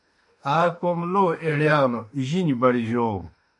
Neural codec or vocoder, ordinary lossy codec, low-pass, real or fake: autoencoder, 48 kHz, 32 numbers a frame, DAC-VAE, trained on Japanese speech; MP3, 48 kbps; 10.8 kHz; fake